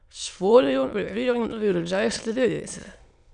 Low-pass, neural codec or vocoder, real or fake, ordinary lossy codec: 9.9 kHz; autoencoder, 22.05 kHz, a latent of 192 numbers a frame, VITS, trained on many speakers; fake; none